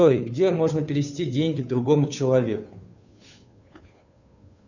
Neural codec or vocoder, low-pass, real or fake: codec, 16 kHz, 2 kbps, FunCodec, trained on Chinese and English, 25 frames a second; 7.2 kHz; fake